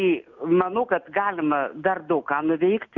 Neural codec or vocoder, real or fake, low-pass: none; real; 7.2 kHz